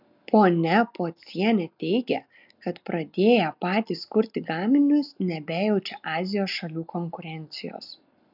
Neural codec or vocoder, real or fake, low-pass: none; real; 5.4 kHz